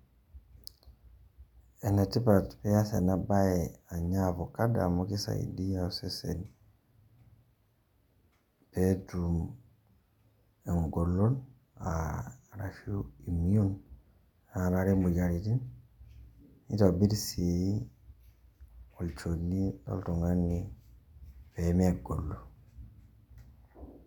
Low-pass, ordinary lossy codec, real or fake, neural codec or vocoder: 19.8 kHz; none; real; none